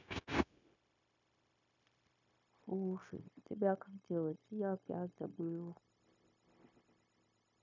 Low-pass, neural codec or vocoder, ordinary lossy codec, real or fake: 7.2 kHz; codec, 16 kHz, 0.9 kbps, LongCat-Audio-Codec; none; fake